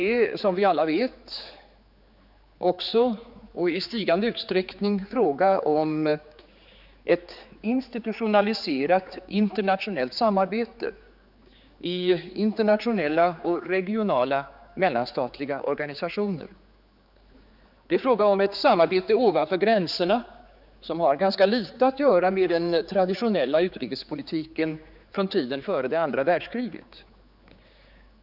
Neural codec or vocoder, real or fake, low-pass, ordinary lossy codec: codec, 16 kHz, 4 kbps, X-Codec, HuBERT features, trained on general audio; fake; 5.4 kHz; none